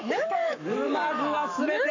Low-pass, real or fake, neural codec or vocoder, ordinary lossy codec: 7.2 kHz; fake; codec, 44.1 kHz, 7.8 kbps, Pupu-Codec; AAC, 48 kbps